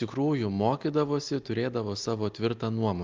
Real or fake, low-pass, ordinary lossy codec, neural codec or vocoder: real; 7.2 kHz; Opus, 24 kbps; none